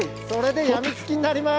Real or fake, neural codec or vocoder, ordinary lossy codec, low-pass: real; none; none; none